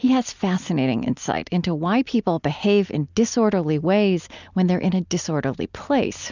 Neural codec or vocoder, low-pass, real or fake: none; 7.2 kHz; real